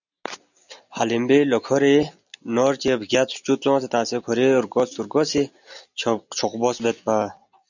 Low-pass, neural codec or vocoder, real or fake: 7.2 kHz; none; real